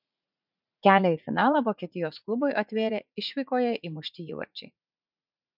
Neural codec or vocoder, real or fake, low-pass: vocoder, 44.1 kHz, 80 mel bands, Vocos; fake; 5.4 kHz